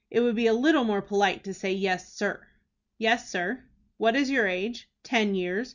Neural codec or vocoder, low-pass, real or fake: none; 7.2 kHz; real